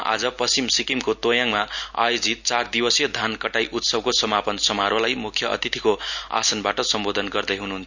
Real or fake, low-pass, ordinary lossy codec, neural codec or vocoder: real; 7.2 kHz; none; none